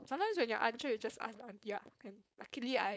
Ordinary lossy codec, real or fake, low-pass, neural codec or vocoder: none; fake; none; codec, 16 kHz, 4.8 kbps, FACodec